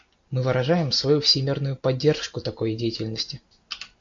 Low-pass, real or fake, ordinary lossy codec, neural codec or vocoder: 7.2 kHz; real; AAC, 48 kbps; none